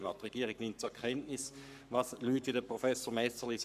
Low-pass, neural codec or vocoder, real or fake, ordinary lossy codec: 14.4 kHz; codec, 44.1 kHz, 7.8 kbps, Pupu-Codec; fake; none